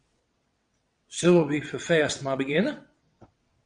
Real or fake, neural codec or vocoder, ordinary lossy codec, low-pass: fake; vocoder, 22.05 kHz, 80 mel bands, WaveNeXt; Opus, 64 kbps; 9.9 kHz